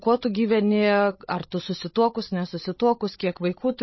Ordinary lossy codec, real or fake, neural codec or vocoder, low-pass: MP3, 24 kbps; fake; codec, 16 kHz, 16 kbps, FreqCodec, larger model; 7.2 kHz